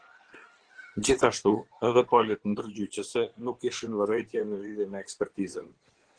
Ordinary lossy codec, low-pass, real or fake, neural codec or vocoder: Opus, 16 kbps; 9.9 kHz; fake; codec, 16 kHz in and 24 kHz out, 2.2 kbps, FireRedTTS-2 codec